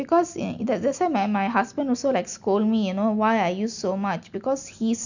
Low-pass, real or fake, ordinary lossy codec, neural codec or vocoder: 7.2 kHz; real; none; none